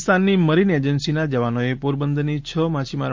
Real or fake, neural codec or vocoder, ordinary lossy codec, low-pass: real; none; Opus, 32 kbps; 7.2 kHz